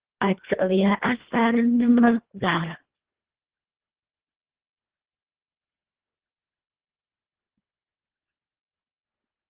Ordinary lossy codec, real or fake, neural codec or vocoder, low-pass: Opus, 16 kbps; fake; codec, 24 kHz, 1.5 kbps, HILCodec; 3.6 kHz